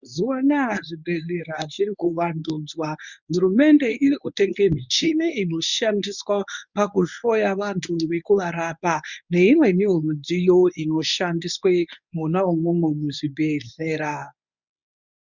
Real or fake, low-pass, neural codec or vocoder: fake; 7.2 kHz; codec, 24 kHz, 0.9 kbps, WavTokenizer, medium speech release version 1